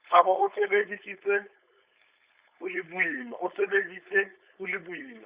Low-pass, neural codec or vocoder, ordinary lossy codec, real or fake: 3.6 kHz; codec, 16 kHz, 4.8 kbps, FACodec; Opus, 64 kbps; fake